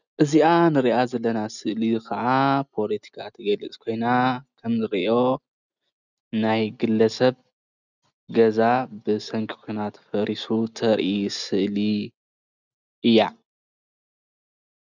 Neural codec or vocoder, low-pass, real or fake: none; 7.2 kHz; real